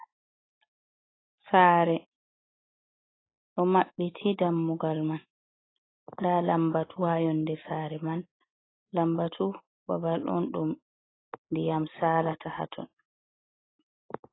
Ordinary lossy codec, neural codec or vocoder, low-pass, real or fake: AAC, 16 kbps; none; 7.2 kHz; real